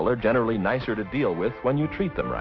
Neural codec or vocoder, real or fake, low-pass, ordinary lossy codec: none; real; 7.2 kHz; MP3, 32 kbps